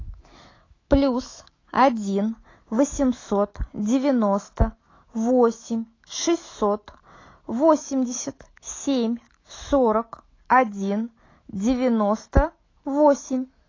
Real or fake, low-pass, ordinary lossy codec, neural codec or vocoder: fake; 7.2 kHz; AAC, 32 kbps; autoencoder, 48 kHz, 128 numbers a frame, DAC-VAE, trained on Japanese speech